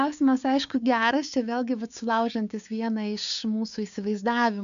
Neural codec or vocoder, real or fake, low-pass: codec, 16 kHz, 6 kbps, DAC; fake; 7.2 kHz